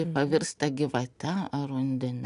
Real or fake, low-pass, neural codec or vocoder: real; 10.8 kHz; none